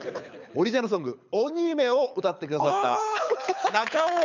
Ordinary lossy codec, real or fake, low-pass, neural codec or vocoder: none; fake; 7.2 kHz; codec, 24 kHz, 6 kbps, HILCodec